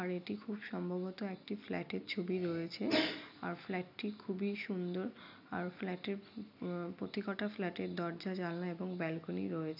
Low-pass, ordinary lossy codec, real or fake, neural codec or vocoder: 5.4 kHz; none; real; none